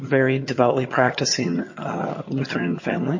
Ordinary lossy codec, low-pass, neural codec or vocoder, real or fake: MP3, 32 kbps; 7.2 kHz; vocoder, 22.05 kHz, 80 mel bands, HiFi-GAN; fake